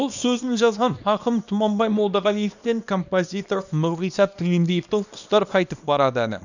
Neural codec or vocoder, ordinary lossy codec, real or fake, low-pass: codec, 24 kHz, 0.9 kbps, WavTokenizer, small release; none; fake; 7.2 kHz